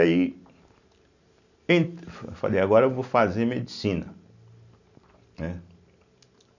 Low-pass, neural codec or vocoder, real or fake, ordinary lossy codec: 7.2 kHz; none; real; none